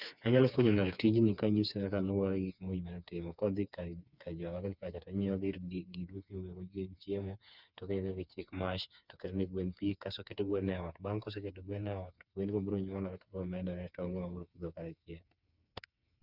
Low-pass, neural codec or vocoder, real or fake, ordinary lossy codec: 5.4 kHz; codec, 16 kHz, 4 kbps, FreqCodec, smaller model; fake; none